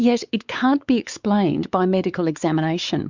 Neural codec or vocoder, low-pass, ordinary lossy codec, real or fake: codec, 16 kHz, 4 kbps, X-Codec, WavLM features, trained on Multilingual LibriSpeech; 7.2 kHz; Opus, 64 kbps; fake